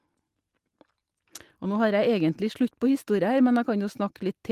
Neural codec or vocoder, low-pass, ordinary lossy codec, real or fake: none; 14.4 kHz; Opus, 24 kbps; real